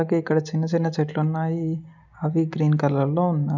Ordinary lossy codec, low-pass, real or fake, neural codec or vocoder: none; 7.2 kHz; real; none